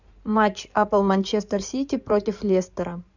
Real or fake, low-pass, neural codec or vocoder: fake; 7.2 kHz; codec, 16 kHz in and 24 kHz out, 2.2 kbps, FireRedTTS-2 codec